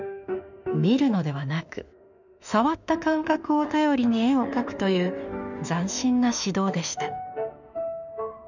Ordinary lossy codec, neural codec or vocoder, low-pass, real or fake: none; autoencoder, 48 kHz, 32 numbers a frame, DAC-VAE, trained on Japanese speech; 7.2 kHz; fake